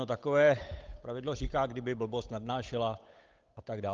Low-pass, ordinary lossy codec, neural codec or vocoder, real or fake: 7.2 kHz; Opus, 24 kbps; codec, 16 kHz, 8 kbps, FunCodec, trained on Chinese and English, 25 frames a second; fake